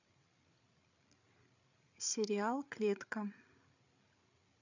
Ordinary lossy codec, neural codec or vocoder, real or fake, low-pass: none; codec, 16 kHz, 8 kbps, FreqCodec, larger model; fake; 7.2 kHz